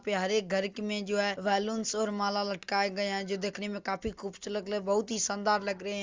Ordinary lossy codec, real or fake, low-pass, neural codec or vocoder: Opus, 32 kbps; real; 7.2 kHz; none